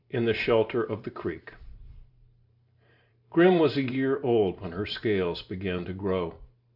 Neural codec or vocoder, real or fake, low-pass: vocoder, 44.1 kHz, 128 mel bands every 512 samples, BigVGAN v2; fake; 5.4 kHz